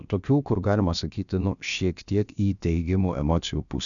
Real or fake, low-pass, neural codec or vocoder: fake; 7.2 kHz; codec, 16 kHz, about 1 kbps, DyCAST, with the encoder's durations